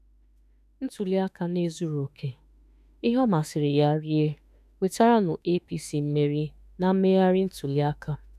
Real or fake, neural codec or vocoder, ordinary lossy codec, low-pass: fake; autoencoder, 48 kHz, 32 numbers a frame, DAC-VAE, trained on Japanese speech; none; 14.4 kHz